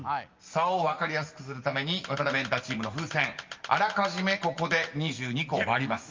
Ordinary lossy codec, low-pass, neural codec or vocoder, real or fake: Opus, 32 kbps; 7.2 kHz; none; real